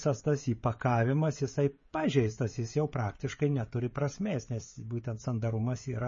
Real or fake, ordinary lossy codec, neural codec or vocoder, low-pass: fake; MP3, 32 kbps; codec, 16 kHz, 16 kbps, FreqCodec, smaller model; 7.2 kHz